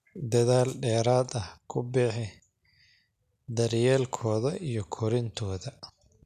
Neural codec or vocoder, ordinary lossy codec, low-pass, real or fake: none; none; 14.4 kHz; real